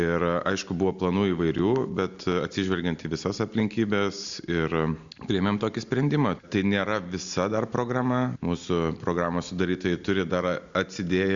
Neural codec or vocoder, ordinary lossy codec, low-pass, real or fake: none; Opus, 64 kbps; 7.2 kHz; real